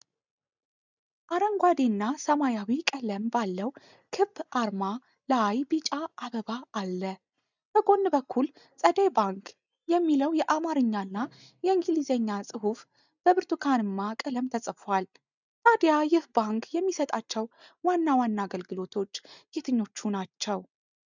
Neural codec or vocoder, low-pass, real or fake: none; 7.2 kHz; real